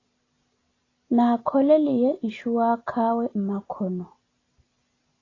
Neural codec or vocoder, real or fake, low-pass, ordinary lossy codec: none; real; 7.2 kHz; AAC, 32 kbps